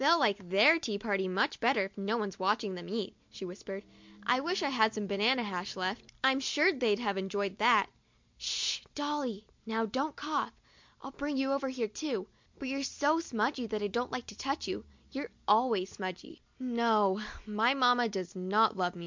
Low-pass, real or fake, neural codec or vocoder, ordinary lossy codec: 7.2 kHz; real; none; MP3, 64 kbps